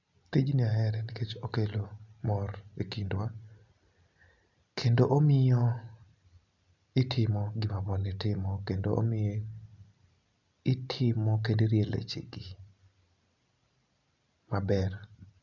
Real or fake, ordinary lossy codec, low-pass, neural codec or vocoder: real; none; 7.2 kHz; none